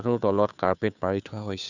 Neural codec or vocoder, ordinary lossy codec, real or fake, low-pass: codec, 16 kHz, 2 kbps, FunCodec, trained on Chinese and English, 25 frames a second; none; fake; 7.2 kHz